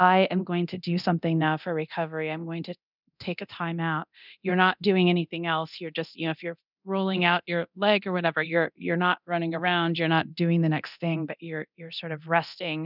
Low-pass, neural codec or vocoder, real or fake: 5.4 kHz; codec, 24 kHz, 0.9 kbps, DualCodec; fake